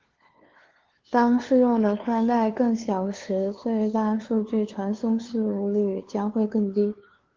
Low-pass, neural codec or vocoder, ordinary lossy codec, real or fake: 7.2 kHz; codec, 16 kHz, 2 kbps, FunCodec, trained on LibriTTS, 25 frames a second; Opus, 16 kbps; fake